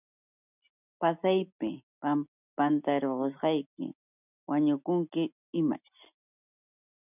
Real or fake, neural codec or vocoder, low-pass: real; none; 3.6 kHz